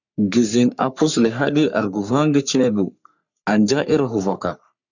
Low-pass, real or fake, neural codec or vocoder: 7.2 kHz; fake; codec, 44.1 kHz, 3.4 kbps, Pupu-Codec